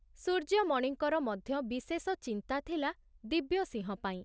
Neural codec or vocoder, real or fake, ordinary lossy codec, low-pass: none; real; none; none